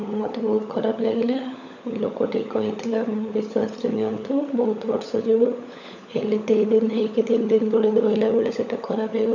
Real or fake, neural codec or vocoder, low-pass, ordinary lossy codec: fake; codec, 16 kHz, 16 kbps, FunCodec, trained on LibriTTS, 50 frames a second; 7.2 kHz; none